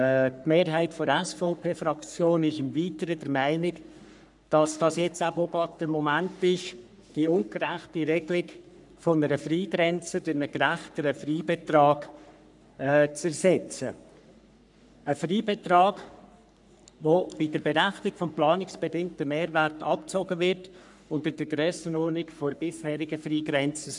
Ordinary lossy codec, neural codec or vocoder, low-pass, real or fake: none; codec, 44.1 kHz, 3.4 kbps, Pupu-Codec; 10.8 kHz; fake